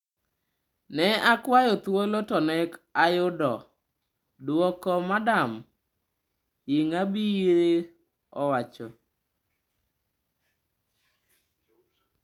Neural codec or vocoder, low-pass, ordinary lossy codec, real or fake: none; 19.8 kHz; none; real